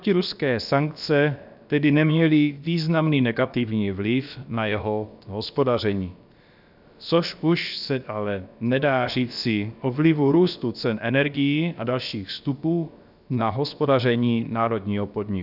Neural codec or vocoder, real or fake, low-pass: codec, 16 kHz, about 1 kbps, DyCAST, with the encoder's durations; fake; 5.4 kHz